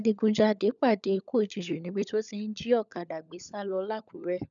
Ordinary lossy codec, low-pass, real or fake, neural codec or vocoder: none; 7.2 kHz; fake; codec, 16 kHz, 16 kbps, FunCodec, trained on LibriTTS, 50 frames a second